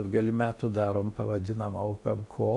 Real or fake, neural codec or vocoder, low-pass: fake; codec, 16 kHz in and 24 kHz out, 0.8 kbps, FocalCodec, streaming, 65536 codes; 10.8 kHz